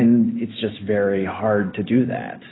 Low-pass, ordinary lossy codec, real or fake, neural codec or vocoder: 7.2 kHz; AAC, 16 kbps; real; none